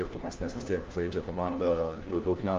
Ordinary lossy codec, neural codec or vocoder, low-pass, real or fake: Opus, 32 kbps; codec, 16 kHz, 1 kbps, FunCodec, trained on LibriTTS, 50 frames a second; 7.2 kHz; fake